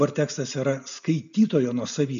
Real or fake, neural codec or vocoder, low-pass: real; none; 7.2 kHz